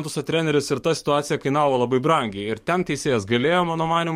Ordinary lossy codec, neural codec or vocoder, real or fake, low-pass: MP3, 64 kbps; codec, 44.1 kHz, 7.8 kbps, DAC; fake; 19.8 kHz